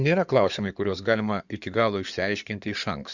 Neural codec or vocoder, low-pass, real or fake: codec, 16 kHz in and 24 kHz out, 2.2 kbps, FireRedTTS-2 codec; 7.2 kHz; fake